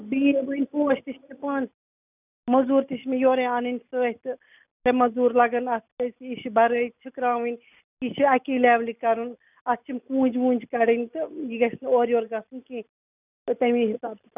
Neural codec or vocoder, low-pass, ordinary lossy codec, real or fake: none; 3.6 kHz; none; real